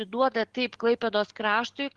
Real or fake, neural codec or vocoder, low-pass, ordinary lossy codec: real; none; 10.8 kHz; Opus, 16 kbps